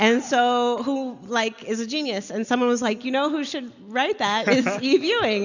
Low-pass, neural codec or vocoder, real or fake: 7.2 kHz; none; real